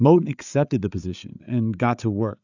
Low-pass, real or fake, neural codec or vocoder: 7.2 kHz; fake; codec, 16 kHz, 8 kbps, FreqCodec, larger model